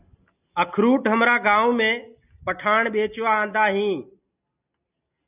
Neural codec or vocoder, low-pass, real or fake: none; 3.6 kHz; real